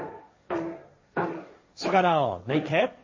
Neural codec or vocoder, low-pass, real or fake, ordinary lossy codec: codec, 16 kHz, 1.1 kbps, Voila-Tokenizer; 7.2 kHz; fake; MP3, 32 kbps